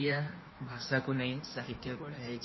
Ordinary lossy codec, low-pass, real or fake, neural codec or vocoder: MP3, 24 kbps; 7.2 kHz; fake; codec, 16 kHz in and 24 kHz out, 1.1 kbps, FireRedTTS-2 codec